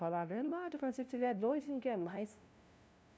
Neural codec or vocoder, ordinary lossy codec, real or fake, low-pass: codec, 16 kHz, 0.5 kbps, FunCodec, trained on LibriTTS, 25 frames a second; none; fake; none